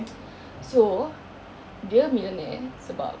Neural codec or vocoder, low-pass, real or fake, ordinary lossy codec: none; none; real; none